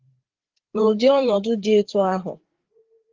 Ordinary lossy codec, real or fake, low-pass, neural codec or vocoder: Opus, 16 kbps; fake; 7.2 kHz; codec, 16 kHz, 4 kbps, FreqCodec, larger model